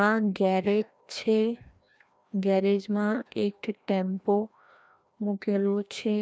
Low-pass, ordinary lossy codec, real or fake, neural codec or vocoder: none; none; fake; codec, 16 kHz, 1 kbps, FreqCodec, larger model